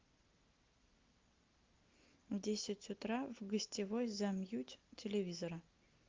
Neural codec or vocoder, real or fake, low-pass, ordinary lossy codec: none; real; 7.2 kHz; Opus, 32 kbps